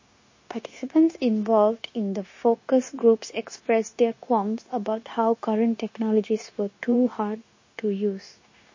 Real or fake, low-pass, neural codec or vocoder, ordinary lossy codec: fake; 7.2 kHz; codec, 16 kHz, 0.9 kbps, LongCat-Audio-Codec; MP3, 32 kbps